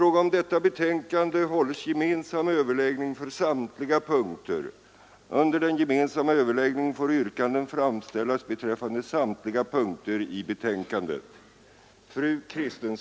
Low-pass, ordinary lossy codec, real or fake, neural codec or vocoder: none; none; real; none